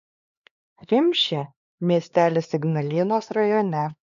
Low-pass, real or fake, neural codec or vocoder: 7.2 kHz; fake; codec, 16 kHz, 2 kbps, X-Codec, HuBERT features, trained on LibriSpeech